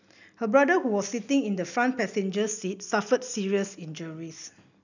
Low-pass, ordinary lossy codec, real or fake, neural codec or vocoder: 7.2 kHz; none; real; none